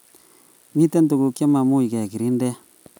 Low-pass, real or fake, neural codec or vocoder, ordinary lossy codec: none; real; none; none